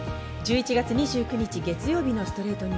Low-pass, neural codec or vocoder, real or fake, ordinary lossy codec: none; none; real; none